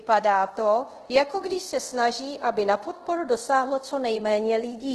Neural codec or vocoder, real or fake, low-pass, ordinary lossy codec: codec, 24 kHz, 0.5 kbps, DualCodec; fake; 10.8 kHz; Opus, 16 kbps